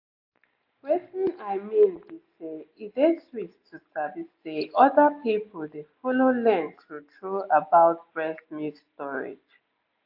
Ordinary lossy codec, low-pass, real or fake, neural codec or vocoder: AAC, 48 kbps; 5.4 kHz; real; none